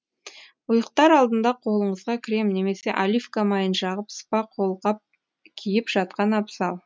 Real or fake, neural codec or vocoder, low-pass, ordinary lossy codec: real; none; none; none